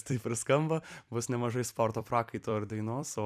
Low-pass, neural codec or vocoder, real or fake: 14.4 kHz; vocoder, 44.1 kHz, 128 mel bands every 256 samples, BigVGAN v2; fake